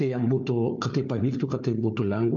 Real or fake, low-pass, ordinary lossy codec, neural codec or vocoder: fake; 7.2 kHz; MP3, 48 kbps; codec, 16 kHz, 4 kbps, FunCodec, trained on Chinese and English, 50 frames a second